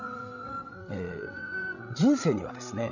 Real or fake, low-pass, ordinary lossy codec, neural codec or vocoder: fake; 7.2 kHz; none; codec, 16 kHz, 16 kbps, FreqCodec, larger model